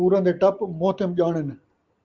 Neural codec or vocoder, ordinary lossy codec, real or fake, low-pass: none; Opus, 32 kbps; real; 7.2 kHz